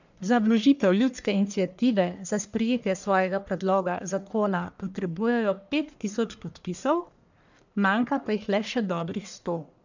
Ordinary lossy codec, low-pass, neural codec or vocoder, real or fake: none; 7.2 kHz; codec, 44.1 kHz, 1.7 kbps, Pupu-Codec; fake